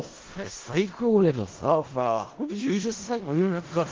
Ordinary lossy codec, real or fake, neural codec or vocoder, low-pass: Opus, 16 kbps; fake; codec, 16 kHz in and 24 kHz out, 0.4 kbps, LongCat-Audio-Codec, four codebook decoder; 7.2 kHz